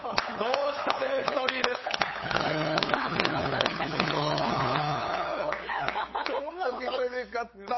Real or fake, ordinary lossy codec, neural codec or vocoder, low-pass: fake; MP3, 24 kbps; codec, 16 kHz, 8 kbps, FunCodec, trained on LibriTTS, 25 frames a second; 7.2 kHz